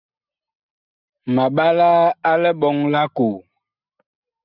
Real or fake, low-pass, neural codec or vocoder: real; 5.4 kHz; none